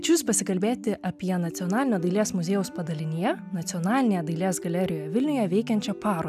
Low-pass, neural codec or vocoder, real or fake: 14.4 kHz; none; real